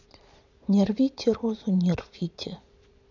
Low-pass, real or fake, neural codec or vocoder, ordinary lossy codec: 7.2 kHz; fake; vocoder, 22.05 kHz, 80 mel bands, Vocos; none